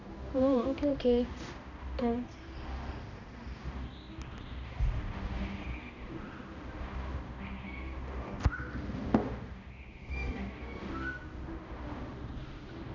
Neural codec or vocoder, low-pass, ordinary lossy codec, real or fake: codec, 16 kHz, 1 kbps, X-Codec, HuBERT features, trained on balanced general audio; 7.2 kHz; none; fake